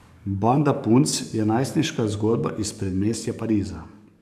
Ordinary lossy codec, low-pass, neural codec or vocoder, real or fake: none; 14.4 kHz; codec, 44.1 kHz, 7.8 kbps, DAC; fake